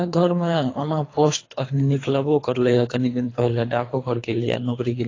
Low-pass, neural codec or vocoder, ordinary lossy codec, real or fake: 7.2 kHz; codec, 24 kHz, 3 kbps, HILCodec; AAC, 32 kbps; fake